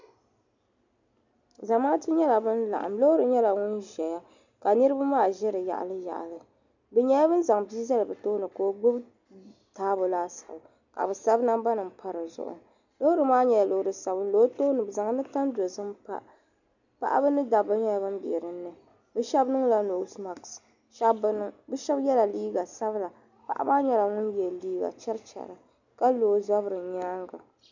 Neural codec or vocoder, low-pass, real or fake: none; 7.2 kHz; real